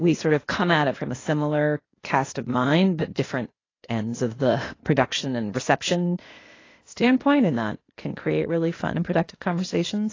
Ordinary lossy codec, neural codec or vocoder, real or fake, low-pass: AAC, 32 kbps; codec, 16 kHz, 0.8 kbps, ZipCodec; fake; 7.2 kHz